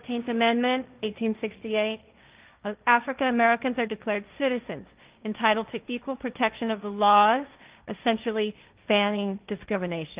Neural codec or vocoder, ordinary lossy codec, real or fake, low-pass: codec, 16 kHz, 1.1 kbps, Voila-Tokenizer; Opus, 24 kbps; fake; 3.6 kHz